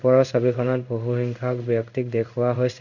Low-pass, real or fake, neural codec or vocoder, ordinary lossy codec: 7.2 kHz; fake; codec, 16 kHz in and 24 kHz out, 1 kbps, XY-Tokenizer; none